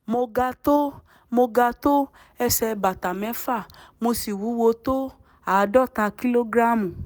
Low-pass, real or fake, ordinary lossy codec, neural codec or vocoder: none; real; none; none